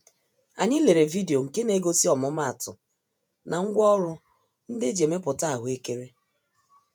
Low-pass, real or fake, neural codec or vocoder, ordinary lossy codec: none; fake; vocoder, 48 kHz, 128 mel bands, Vocos; none